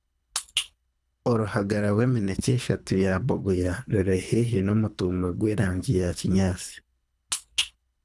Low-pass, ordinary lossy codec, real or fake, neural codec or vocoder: none; none; fake; codec, 24 kHz, 3 kbps, HILCodec